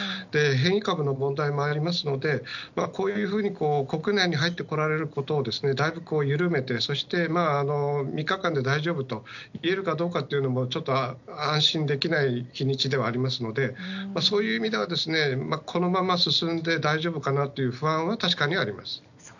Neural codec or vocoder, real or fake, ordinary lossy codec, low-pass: none; real; none; 7.2 kHz